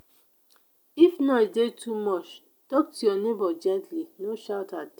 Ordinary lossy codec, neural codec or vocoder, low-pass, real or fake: none; none; none; real